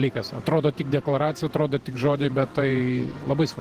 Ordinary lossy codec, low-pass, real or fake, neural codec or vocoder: Opus, 16 kbps; 14.4 kHz; fake; vocoder, 48 kHz, 128 mel bands, Vocos